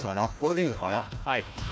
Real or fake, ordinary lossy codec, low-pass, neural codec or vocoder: fake; none; none; codec, 16 kHz, 1 kbps, FunCodec, trained on Chinese and English, 50 frames a second